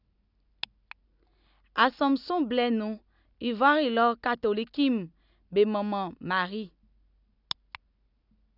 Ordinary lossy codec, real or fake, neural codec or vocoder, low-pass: none; real; none; 5.4 kHz